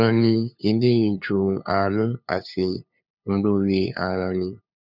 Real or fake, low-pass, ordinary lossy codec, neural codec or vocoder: fake; 5.4 kHz; none; codec, 16 kHz, 2 kbps, FunCodec, trained on LibriTTS, 25 frames a second